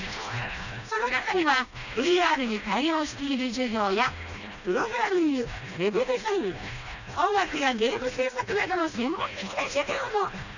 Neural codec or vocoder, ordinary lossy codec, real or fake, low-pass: codec, 16 kHz, 1 kbps, FreqCodec, smaller model; none; fake; 7.2 kHz